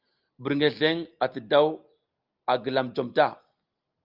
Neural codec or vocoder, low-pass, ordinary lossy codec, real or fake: none; 5.4 kHz; Opus, 24 kbps; real